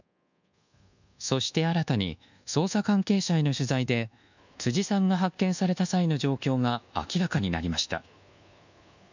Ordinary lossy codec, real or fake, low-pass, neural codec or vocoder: none; fake; 7.2 kHz; codec, 24 kHz, 1.2 kbps, DualCodec